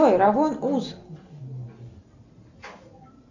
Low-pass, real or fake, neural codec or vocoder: 7.2 kHz; real; none